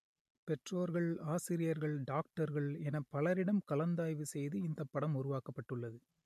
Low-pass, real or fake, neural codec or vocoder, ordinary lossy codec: 14.4 kHz; real; none; MP3, 64 kbps